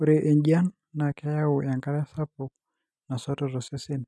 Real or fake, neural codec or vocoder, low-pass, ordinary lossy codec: real; none; none; none